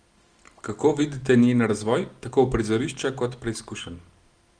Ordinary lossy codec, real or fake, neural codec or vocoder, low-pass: Opus, 24 kbps; real; none; 9.9 kHz